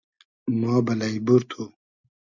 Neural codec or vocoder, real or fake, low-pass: none; real; 7.2 kHz